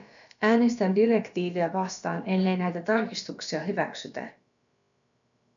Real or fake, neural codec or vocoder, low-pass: fake; codec, 16 kHz, about 1 kbps, DyCAST, with the encoder's durations; 7.2 kHz